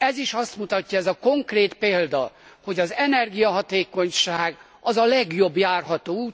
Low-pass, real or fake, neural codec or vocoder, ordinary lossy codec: none; real; none; none